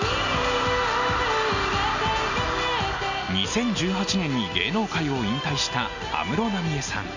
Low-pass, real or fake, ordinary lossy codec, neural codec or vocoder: 7.2 kHz; real; none; none